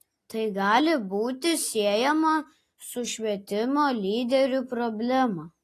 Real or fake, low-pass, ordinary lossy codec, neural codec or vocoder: real; 14.4 kHz; AAC, 48 kbps; none